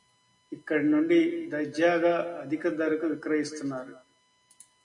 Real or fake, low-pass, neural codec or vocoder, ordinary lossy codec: real; 10.8 kHz; none; AAC, 64 kbps